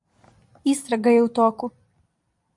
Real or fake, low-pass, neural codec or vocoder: fake; 10.8 kHz; vocoder, 44.1 kHz, 128 mel bands every 256 samples, BigVGAN v2